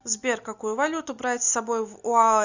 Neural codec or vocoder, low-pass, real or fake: none; 7.2 kHz; real